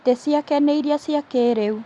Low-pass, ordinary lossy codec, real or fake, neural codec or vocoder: 10.8 kHz; Opus, 64 kbps; real; none